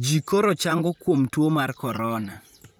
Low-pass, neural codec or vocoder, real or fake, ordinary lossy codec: none; vocoder, 44.1 kHz, 128 mel bands, Pupu-Vocoder; fake; none